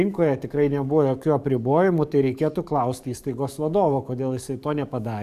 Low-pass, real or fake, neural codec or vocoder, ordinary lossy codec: 14.4 kHz; fake; codec, 44.1 kHz, 7.8 kbps, DAC; AAC, 96 kbps